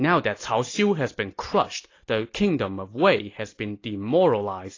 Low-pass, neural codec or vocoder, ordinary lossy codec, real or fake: 7.2 kHz; none; AAC, 32 kbps; real